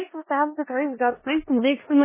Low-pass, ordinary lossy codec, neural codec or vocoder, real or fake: 3.6 kHz; MP3, 16 kbps; codec, 16 kHz in and 24 kHz out, 0.4 kbps, LongCat-Audio-Codec, four codebook decoder; fake